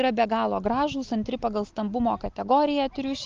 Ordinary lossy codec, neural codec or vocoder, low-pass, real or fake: Opus, 32 kbps; none; 7.2 kHz; real